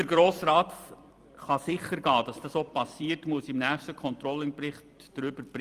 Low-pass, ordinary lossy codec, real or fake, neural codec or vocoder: 14.4 kHz; Opus, 32 kbps; fake; vocoder, 44.1 kHz, 128 mel bands every 256 samples, BigVGAN v2